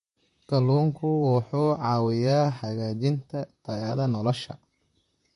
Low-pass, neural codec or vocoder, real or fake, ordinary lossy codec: 14.4 kHz; vocoder, 44.1 kHz, 128 mel bands, Pupu-Vocoder; fake; MP3, 48 kbps